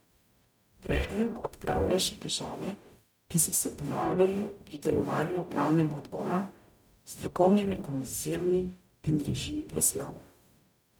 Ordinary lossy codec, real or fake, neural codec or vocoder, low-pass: none; fake; codec, 44.1 kHz, 0.9 kbps, DAC; none